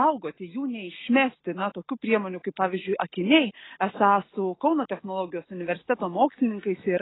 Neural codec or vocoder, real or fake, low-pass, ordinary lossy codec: none; real; 7.2 kHz; AAC, 16 kbps